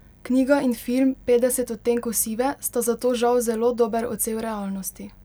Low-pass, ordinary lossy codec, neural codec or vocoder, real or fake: none; none; none; real